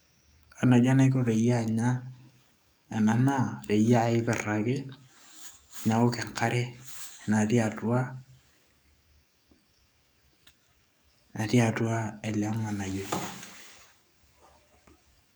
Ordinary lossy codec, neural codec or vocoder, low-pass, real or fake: none; codec, 44.1 kHz, 7.8 kbps, DAC; none; fake